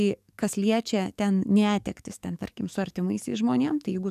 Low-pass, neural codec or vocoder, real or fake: 14.4 kHz; codec, 44.1 kHz, 7.8 kbps, DAC; fake